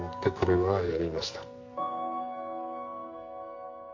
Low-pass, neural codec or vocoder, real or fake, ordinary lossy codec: 7.2 kHz; codec, 44.1 kHz, 2.6 kbps, SNAC; fake; MP3, 48 kbps